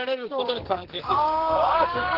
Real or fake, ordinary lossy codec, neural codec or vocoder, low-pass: fake; Opus, 16 kbps; codec, 44.1 kHz, 2.6 kbps, SNAC; 5.4 kHz